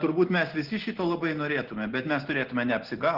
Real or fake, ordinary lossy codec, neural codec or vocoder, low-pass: real; Opus, 16 kbps; none; 5.4 kHz